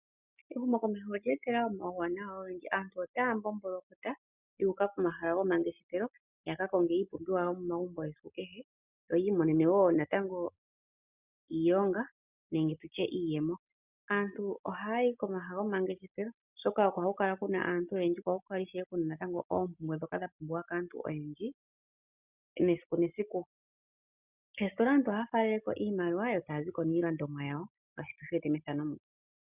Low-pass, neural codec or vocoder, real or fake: 3.6 kHz; none; real